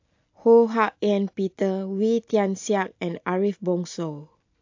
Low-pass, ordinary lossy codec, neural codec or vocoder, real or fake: 7.2 kHz; AAC, 48 kbps; none; real